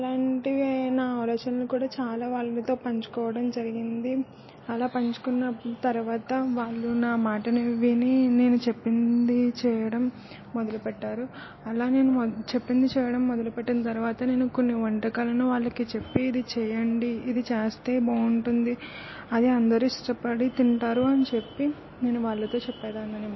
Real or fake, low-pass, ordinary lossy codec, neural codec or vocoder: real; 7.2 kHz; MP3, 24 kbps; none